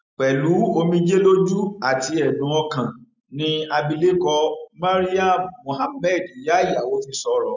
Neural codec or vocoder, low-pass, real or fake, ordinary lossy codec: none; 7.2 kHz; real; none